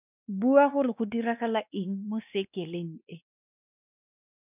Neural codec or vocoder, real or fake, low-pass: codec, 16 kHz, 2 kbps, X-Codec, WavLM features, trained on Multilingual LibriSpeech; fake; 3.6 kHz